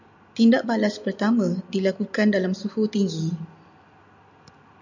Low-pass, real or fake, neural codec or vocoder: 7.2 kHz; real; none